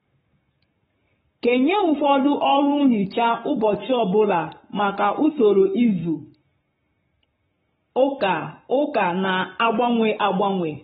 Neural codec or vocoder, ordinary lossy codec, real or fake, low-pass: codec, 44.1 kHz, 7.8 kbps, Pupu-Codec; AAC, 16 kbps; fake; 19.8 kHz